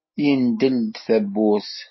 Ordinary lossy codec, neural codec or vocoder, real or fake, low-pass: MP3, 24 kbps; none; real; 7.2 kHz